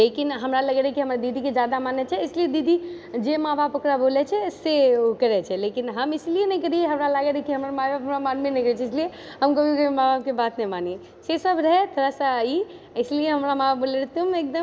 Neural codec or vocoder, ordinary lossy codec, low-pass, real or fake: none; none; none; real